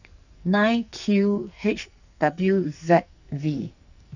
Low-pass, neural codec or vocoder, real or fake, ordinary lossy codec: 7.2 kHz; codec, 44.1 kHz, 2.6 kbps, SNAC; fake; none